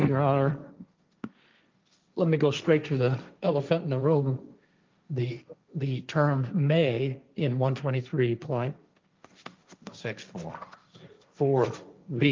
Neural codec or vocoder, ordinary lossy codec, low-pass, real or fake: codec, 16 kHz, 1.1 kbps, Voila-Tokenizer; Opus, 24 kbps; 7.2 kHz; fake